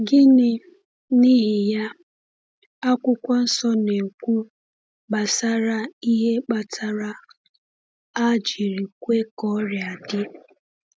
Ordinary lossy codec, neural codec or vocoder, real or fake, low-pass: none; none; real; none